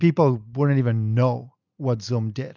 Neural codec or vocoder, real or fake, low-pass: none; real; 7.2 kHz